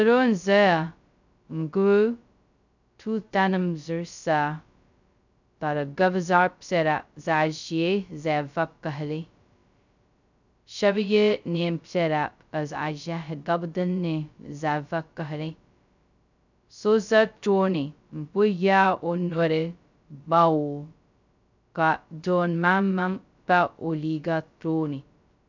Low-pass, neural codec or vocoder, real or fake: 7.2 kHz; codec, 16 kHz, 0.2 kbps, FocalCodec; fake